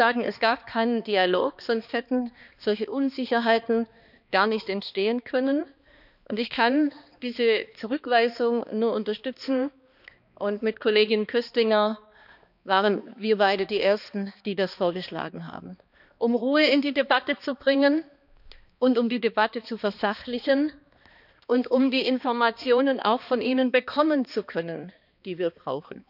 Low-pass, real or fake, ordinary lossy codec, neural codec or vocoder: 5.4 kHz; fake; none; codec, 16 kHz, 2 kbps, X-Codec, HuBERT features, trained on balanced general audio